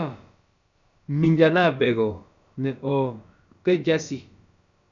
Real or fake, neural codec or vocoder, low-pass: fake; codec, 16 kHz, about 1 kbps, DyCAST, with the encoder's durations; 7.2 kHz